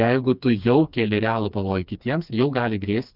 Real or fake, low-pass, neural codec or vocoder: fake; 5.4 kHz; codec, 16 kHz, 2 kbps, FreqCodec, smaller model